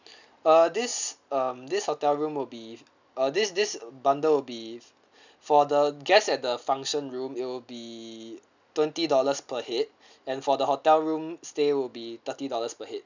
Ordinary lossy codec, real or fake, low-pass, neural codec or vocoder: none; real; 7.2 kHz; none